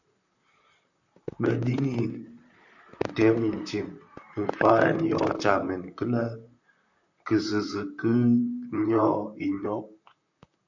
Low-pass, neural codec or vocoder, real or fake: 7.2 kHz; vocoder, 44.1 kHz, 128 mel bands, Pupu-Vocoder; fake